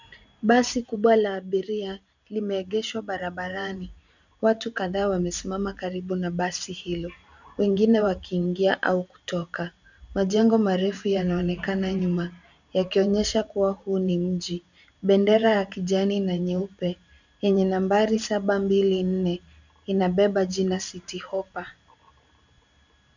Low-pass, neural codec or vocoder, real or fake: 7.2 kHz; vocoder, 44.1 kHz, 128 mel bands every 512 samples, BigVGAN v2; fake